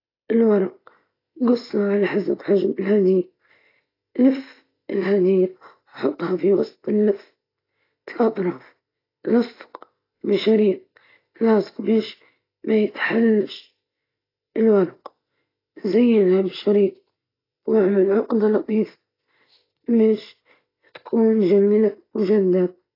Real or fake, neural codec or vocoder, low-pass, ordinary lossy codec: real; none; 5.4 kHz; AAC, 24 kbps